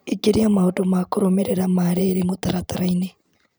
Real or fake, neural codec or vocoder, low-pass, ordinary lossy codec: fake; vocoder, 44.1 kHz, 128 mel bands every 512 samples, BigVGAN v2; none; none